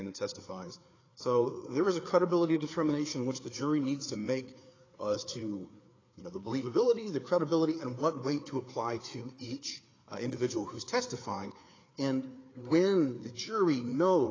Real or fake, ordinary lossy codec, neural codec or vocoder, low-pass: fake; AAC, 32 kbps; codec, 16 kHz, 4 kbps, FreqCodec, larger model; 7.2 kHz